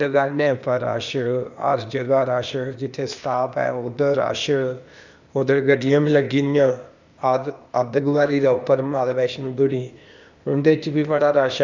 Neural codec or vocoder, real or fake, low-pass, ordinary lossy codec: codec, 16 kHz, 0.8 kbps, ZipCodec; fake; 7.2 kHz; none